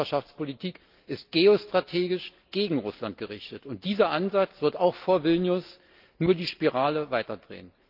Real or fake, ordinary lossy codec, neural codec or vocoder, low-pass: real; Opus, 32 kbps; none; 5.4 kHz